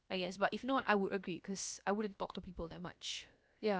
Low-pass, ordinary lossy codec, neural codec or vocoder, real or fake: none; none; codec, 16 kHz, about 1 kbps, DyCAST, with the encoder's durations; fake